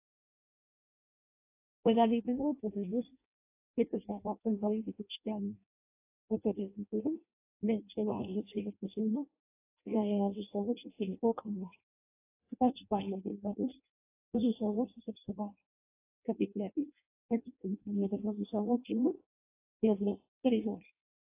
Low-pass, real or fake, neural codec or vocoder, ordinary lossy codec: 3.6 kHz; fake; codec, 16 kHz in and 24 kHz out, 0.6 kbps, FireRedTTS-2 codec; AAC, 24 kbps